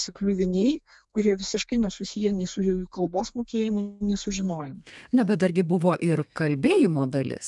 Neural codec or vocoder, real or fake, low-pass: codec, 32 kHz, 1.9 kbps, SNAC; fake; 10.8 kHz